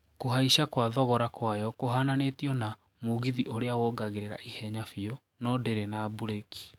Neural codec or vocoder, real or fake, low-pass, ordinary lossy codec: codec, 44.1 kHz, 7.8 kbps, DAC; fake; 19.8 kHz; none